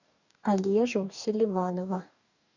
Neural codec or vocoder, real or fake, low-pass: codec, 44.1 kHz, 2.6 kbps, DAC; fake; 7.2 kHz